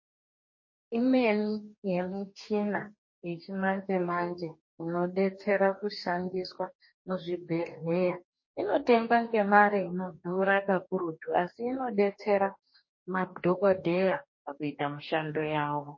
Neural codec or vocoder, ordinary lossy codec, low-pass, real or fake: codec, 44.1 kHz, 2.6 kbps, DAC; MP3, 32 kbps; 7.2 kHz; fake